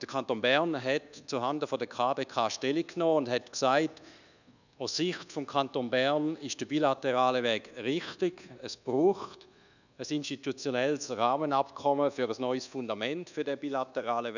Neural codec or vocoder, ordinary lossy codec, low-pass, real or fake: codec, 24 kHz, 1.2 kbps, DualCodec; none; 7.2 kHz; fake